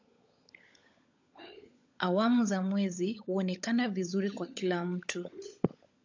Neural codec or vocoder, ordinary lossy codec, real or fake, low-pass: codec, 16 kHz, 16 kbps, FunCodec, trained on LibriTTS, 50 frames a second; AAC, 48 kbps; fake; 7.2 kHz